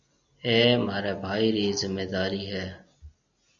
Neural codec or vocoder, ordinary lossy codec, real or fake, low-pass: none; MP3, 64 kbps; real; 7.2 kHz